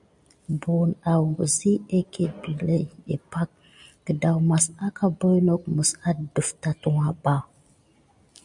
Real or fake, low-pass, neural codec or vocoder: real; 10.8 kHz; none